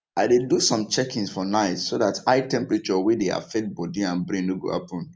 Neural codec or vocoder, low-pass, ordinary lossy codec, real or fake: none; none; none; real